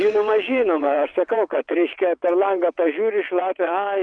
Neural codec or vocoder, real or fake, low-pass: vocoder, 22.05 kHz, 80 mel bands, WaveNeXt; fake; 9.9 kHz